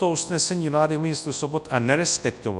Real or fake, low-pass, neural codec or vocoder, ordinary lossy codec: fake; 10.8 kHz; codec, 24 kHz, 0.9 kbps, WavTokenizer, large speech release; MP3, 96 kbps